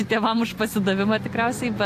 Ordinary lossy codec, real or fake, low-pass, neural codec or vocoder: AAC, 64 kbps; real; 14.4 kHz; none